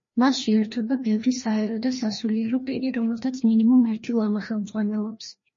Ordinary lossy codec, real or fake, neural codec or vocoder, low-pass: MP3, 32 kbps; fake; codec, 16 kHz, 1 kbps, FreqCodec, larger model; 7.2 kHz